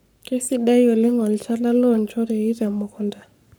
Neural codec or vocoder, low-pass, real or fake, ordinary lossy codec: codec, 44.1 kHz, 7.8 kbps, Pupu-Codec; none; fake; none